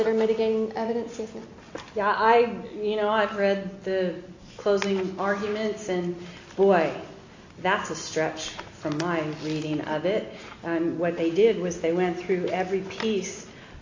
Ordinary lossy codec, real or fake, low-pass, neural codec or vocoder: AAC, 48 kbps; real; 7.2 kHz; none